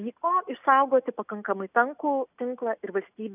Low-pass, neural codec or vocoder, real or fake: 3.6 kHz; none; real